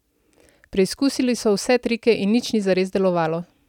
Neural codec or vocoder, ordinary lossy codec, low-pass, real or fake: none; none; 19.8 kHz; real